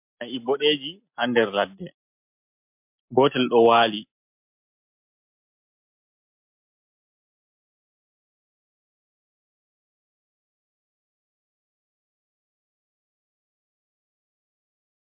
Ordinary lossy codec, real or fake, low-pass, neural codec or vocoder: MP3, 32 kbps; real; 3.6 kHz; none